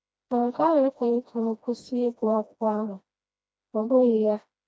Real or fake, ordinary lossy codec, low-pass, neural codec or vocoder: fake; none; none; codec, 16 kHz, 1 kbps, FreqCodec, smaller model